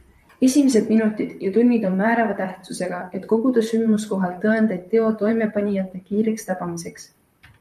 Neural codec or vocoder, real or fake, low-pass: vocoder, 44.1 kHz, 128 mel bands, Pupu-Vocoder; fake; 14.4 kHz